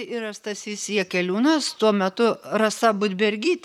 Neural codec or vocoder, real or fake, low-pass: vocoder, 44.1 kHz, 128 mel bands, Pupu-Vocoder; fake; 19.8 kHz